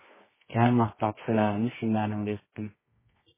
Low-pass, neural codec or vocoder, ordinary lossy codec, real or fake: 3.6 kHz; codec, 24 kHz, 0.9 kbps, WavTokenizer, medium music audio release; MP3, 16 kbps; fake